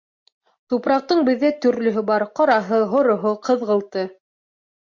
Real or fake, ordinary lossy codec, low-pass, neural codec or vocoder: real; MP3, 48 kbps; 7.2 kHz; none